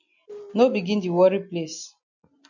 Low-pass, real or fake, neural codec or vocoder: 7.2 kHz; real; none